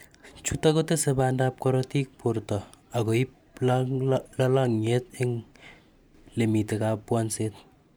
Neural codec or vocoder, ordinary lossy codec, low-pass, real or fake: vocoder, 44.1 kHz, 128 mel bands every 512 samples, BigVGAN v2; none; none; fake